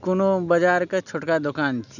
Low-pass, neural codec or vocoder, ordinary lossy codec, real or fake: 7.2 kHz; none; none; real